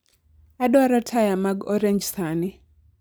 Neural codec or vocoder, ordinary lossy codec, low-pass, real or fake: none; none; none; real